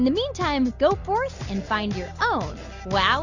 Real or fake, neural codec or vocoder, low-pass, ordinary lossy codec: real; none; 7.2 kHz; Opus, 64 kbps